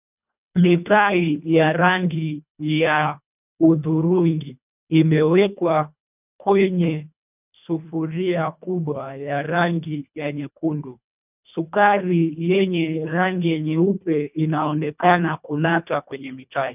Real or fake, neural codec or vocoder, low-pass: fake; codec, 24 kHz, 1.5 kbps, HILCodec; 3.6 kHz